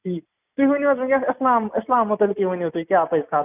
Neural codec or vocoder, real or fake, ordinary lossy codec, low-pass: none; real; none; 3.6 kHz